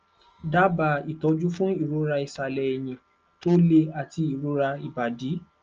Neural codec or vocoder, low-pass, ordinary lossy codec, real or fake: none; 7.2 kHz; Opus, 24 kbps; real